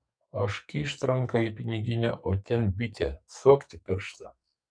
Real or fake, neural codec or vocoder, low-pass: fake; codec, 44.1 kHz, 2.6 kbps, SNAC; 9.9 kHz